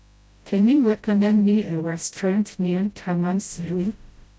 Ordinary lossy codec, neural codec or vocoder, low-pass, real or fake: none; codec, 16 kHz, 0.5 kbps, FreqCodec, smaller model; none; fake